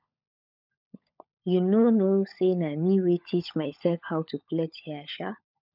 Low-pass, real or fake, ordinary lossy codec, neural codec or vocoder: 5.4 kHz; fake; none; codec, 16 kHz, 16 kbps, FunCodec, trained on LibriTTS, 50 frames a second